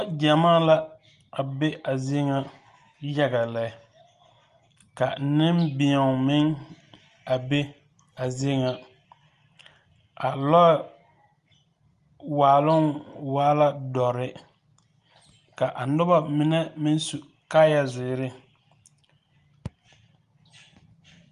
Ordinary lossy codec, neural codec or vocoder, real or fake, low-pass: Opus, 32 kbps; none; real; 10.8 kHz